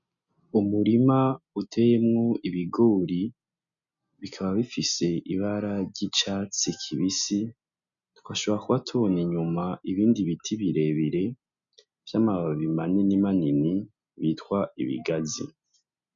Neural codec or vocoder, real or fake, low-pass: none; real; 7.2 kHz